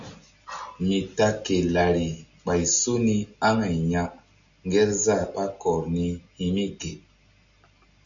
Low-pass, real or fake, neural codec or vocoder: 7.2 kHz; real; none